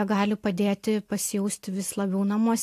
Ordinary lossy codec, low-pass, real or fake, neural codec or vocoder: AAC, 64 kbps; 14.4 kHz; real; none